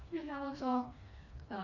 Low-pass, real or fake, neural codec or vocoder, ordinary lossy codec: 7.2 kHz; fake; codec, 16 kHz, 2 kbps, FreqCodec, smaller model; none